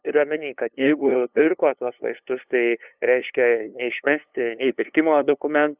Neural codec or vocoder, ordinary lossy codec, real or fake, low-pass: codec, 16 kHz, 2 kbps, FunCodec, trained on LibriTTS, 25 frames a second; Opus, 64 kbps; fake; 3.6 kHz